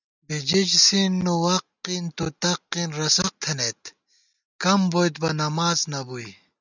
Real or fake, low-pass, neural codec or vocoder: real; 7.2 kHz; none